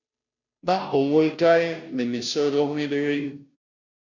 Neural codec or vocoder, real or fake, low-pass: codec, 16 kHz, 0.5 kbps, FunCodec, trained on Chinese and English, 25 frames a second; fake; 7.2 kHz